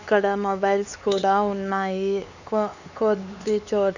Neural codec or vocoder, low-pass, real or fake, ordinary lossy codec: codec, 16 kHz, 4 kbps, X-Codec, HuBERT features, trained on LibriSpeech; 7.2 kHz; fake; none